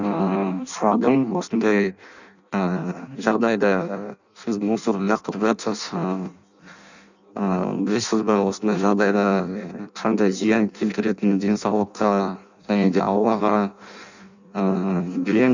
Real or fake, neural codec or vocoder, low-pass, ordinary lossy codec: fake; codec, 16 kHz in and 24 kHz out, 0.6 kbps, FireRedTTS-2 codec; 7.2 kHz; none